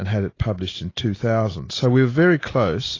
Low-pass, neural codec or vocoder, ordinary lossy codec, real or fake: 7.2 kHz; none; AAC, 32 kbps; real